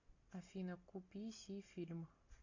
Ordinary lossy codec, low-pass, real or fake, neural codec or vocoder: AAC, 48 kbps; 7.2 kHz; real; none